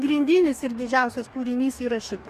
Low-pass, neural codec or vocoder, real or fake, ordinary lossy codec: 14.4 kHz; codec, 44.1 kHz, 2.6 kbps, DAC; fake; Opus, 64 kbps